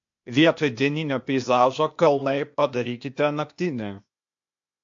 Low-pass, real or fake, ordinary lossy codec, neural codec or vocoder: 7.2 kHz; fake; MP3, 48 kbps; codec, 16 kHz, 0.8 kbps, ZipCodec